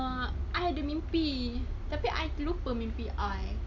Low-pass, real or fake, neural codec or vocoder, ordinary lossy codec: 7.2 kHz; real; none; none